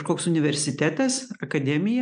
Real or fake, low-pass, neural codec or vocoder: real; 9.9 kHz; none